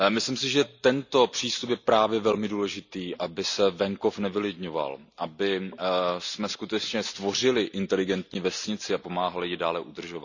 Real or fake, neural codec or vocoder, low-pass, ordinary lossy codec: real; none; 7.2 kHz; none